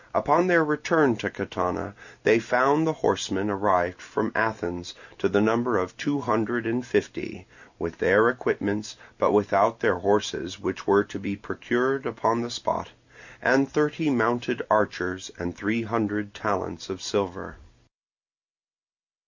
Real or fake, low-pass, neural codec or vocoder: real; 7.2 kHz; none